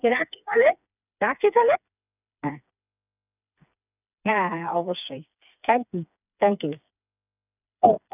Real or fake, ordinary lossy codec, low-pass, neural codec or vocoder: fake; none; 3.6 kHz; codec, 16 kHz, 4 kbps, FreqCodec, smaller model